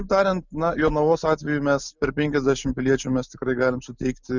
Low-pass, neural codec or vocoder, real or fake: 7.2 kHz; none; real